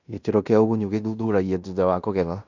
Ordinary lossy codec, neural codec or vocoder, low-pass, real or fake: none; codec, 16 kHz in and 24 kHz out, 0.9 kbps, LongCat-Audio-Codec, fine tuned four codebook decoder; 7.2 kHz; fake